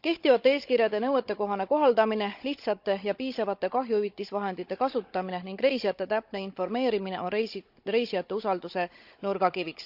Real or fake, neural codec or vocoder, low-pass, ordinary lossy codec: fake; codec, 16 kHz, 16 kbps, FunCodec, trained on Chinese and English, 50 frames a second; 5.4 kHz; Opus, 64 kbps